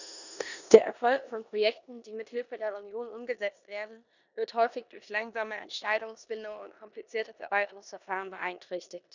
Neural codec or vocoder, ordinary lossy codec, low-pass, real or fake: codec, 16 kHz in and 24 kHz out, 0.9 kbps, LongCat-Audio-Codec, four codebook decoder; none; 7.2 kHz; fake